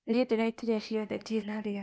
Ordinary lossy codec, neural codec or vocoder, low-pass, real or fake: none; codec, 16 kHz, 0.8 kbps, ZipCodec; none; fake